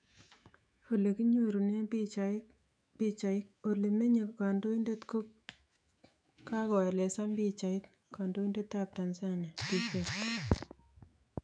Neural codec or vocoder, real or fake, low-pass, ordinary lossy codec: autoencoder, 48 kHz, 128 numbers a frame, DAC-VAE, trained on Japanese speech; fake; 9.9 kHz; none